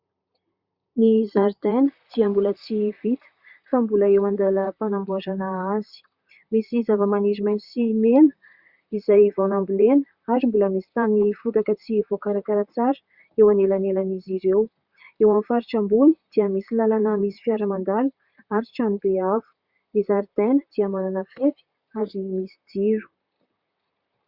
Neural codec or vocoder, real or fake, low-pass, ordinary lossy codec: vocoder, 44.1 kHz, 128 mel bands, Pupu-Vocoder; fake; 5.4 kHz; Opus, 64 kbps